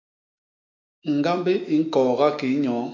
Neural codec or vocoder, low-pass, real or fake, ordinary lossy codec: autoencoder, 48 kHz, 128 numbers a frame, DAC-VAE, trained on Japanese speech; 7.2 kHz; fake; MP3, 48 kbps